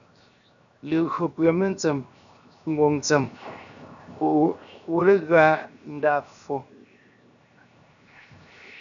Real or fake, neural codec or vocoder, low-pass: fake; codec, 16 kHz, 0.7 kbps, FocalCodec; 7.2 kHz